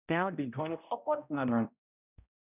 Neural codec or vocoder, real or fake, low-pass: codec, 16 kHz, 0.5 kbps, X-Codec, HuBERT features, trained on balanced general audio; fake; 3.6 kHz